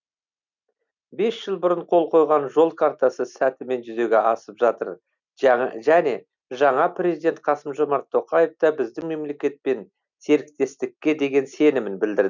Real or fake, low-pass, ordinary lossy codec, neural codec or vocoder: real; 7.2 kHz; none; none